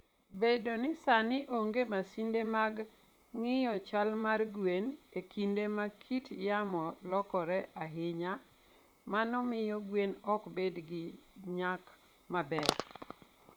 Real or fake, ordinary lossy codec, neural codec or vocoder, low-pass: fake; none; vocoder, 44.1 kHz, 128 mel bands, Pupu-Vocoder; none